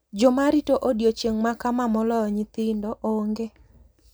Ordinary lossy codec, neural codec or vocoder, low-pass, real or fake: none; none; none; real